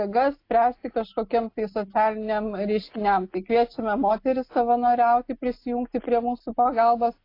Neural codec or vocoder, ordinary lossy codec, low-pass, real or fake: none; AAC, 32 kbps; 5.4 kHz; real